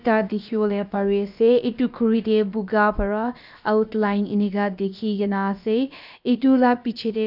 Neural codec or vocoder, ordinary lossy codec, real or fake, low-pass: codec, 16 kHz, about 1 kbps, DyCAST, with the encoder's durations; none; fake; 5.4 kHz